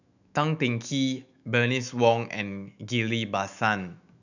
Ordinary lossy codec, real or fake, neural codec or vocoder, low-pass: none; fake; autoencoder, 48 kHz, 128 numbers a frame, DAC-VAE, trained on Japanese speech; 7.2 kHz